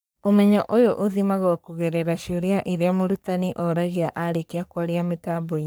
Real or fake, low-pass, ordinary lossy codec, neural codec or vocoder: fake; none; none; codec, 44.1 kHz, 3.4 kbps, Pupu-Codec